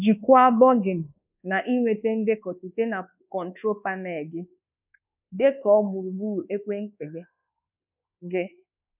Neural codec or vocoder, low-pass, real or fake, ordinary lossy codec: codec, 24 kHz, 1.2 kbps, DualCodec; 3.6 kHz; fake; none